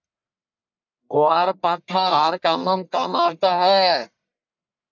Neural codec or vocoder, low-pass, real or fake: codec, 44.1 kHz, 1.7 kbps, Pupu-Codec; 7.2 kHz; fake